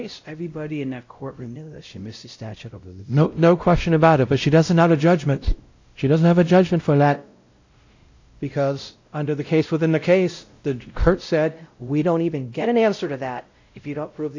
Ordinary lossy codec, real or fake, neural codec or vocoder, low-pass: AAC, 48 kbps; fake; codec, 16 kHz, 0.5 kbps, X-Codec, WavLM features, trained on Multilingual LibriSpeech; 7.2 kHz